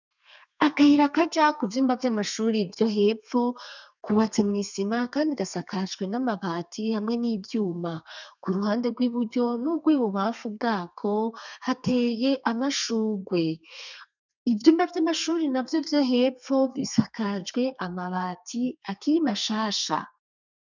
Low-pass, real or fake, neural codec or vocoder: 7.2 kHz; fake; codec, 32 kHz, 1.9 kbps, SNAC